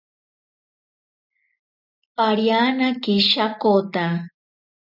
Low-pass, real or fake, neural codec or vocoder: 5.4 kHz; real; none